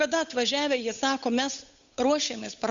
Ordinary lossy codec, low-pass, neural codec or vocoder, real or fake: Opus, 64 kbps; 7.2 kHz; codec, 16 kHz, 8 kbps, FunCodec, trained on Chinese and English, 25 frames a second; fake